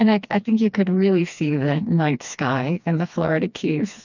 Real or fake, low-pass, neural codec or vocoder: fake; 7.2 kHz; codec, 16 kHz, 2 kbps, FreqCodec, smaller model